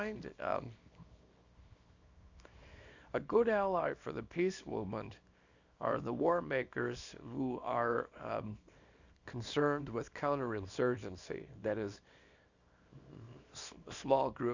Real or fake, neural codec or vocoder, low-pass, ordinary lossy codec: fake; codec, 24 kHz, 0.9 kbps, WavTokenizer, small release; 7.2 kHz; Opus, 64 kbps